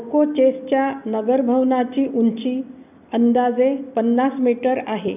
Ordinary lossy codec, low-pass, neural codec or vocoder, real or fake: none; 3.6 kHz; none; real